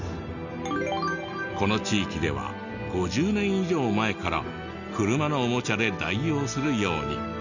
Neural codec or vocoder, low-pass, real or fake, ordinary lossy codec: none; 7.2 kHz; real; none